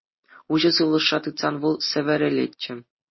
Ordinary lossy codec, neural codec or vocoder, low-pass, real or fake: MP3, 24 kbps; none; 7.2 kHz; real